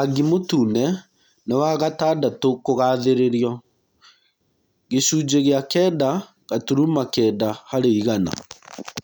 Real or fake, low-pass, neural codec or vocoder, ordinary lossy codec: real; none; none; none